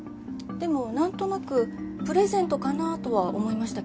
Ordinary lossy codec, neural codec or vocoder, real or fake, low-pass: none; none; real; none